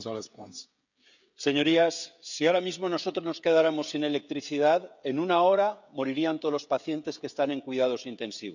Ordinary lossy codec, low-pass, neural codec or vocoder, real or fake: none; 7.2 kHz; codec, 16 kHz, 16 kbps, FreqCodec, smaller model; fake